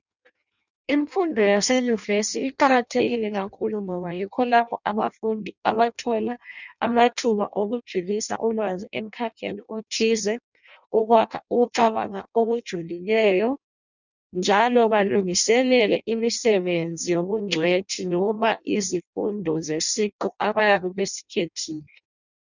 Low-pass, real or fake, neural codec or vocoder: 7.2 kHz; fake; codec, 16 kHz in and 24 kHz out, 0.6 kbps, FireRedTTS-2 codec